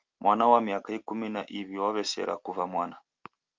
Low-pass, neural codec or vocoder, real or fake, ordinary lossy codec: 7.2 kHz; none; real; Opus, 32 kbps